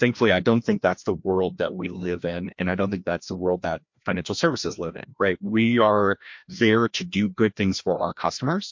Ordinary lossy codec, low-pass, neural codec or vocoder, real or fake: MP3, 48 kbps; 7.2 kHz; codec, 16 kHz, 1 kbps, FreqCodec, larger model; fake